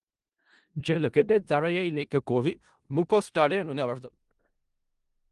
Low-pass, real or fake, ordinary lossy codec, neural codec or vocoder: 10.8 kHz; fake; Opus, 24 kbps; codec, 16 kHz in and 24 kHz out, 0.4 kbps, LongCat-Audio-Codec, four codebook decoder